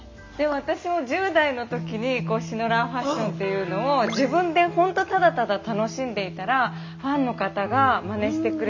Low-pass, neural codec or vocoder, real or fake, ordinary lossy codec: 7.2 kHz; none; real; MP3, 48 kbps